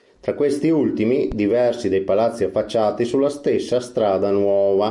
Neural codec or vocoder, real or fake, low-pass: none; real; 10.8 kHz